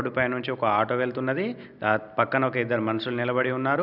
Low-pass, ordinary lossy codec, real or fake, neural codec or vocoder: 5.4 kHz; none; real; none